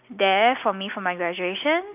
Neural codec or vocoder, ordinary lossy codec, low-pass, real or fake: none; none; 3.6 kHz; real